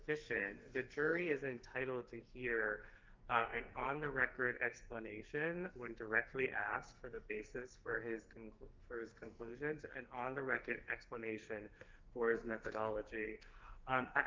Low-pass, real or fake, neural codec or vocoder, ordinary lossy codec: 7.2 kHz; fake; codec, 44.1 kHz, 2.6 kbps, SNAC; Opus, 24 kbps